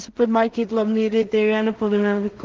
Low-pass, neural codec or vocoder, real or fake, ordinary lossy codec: 7.2 kHz; codec, 16 kHz in and 24 kHz out, 0.4 kbps, LongCat-Audio-Codec, two codebook decoder; fake; Opus, 16 kbps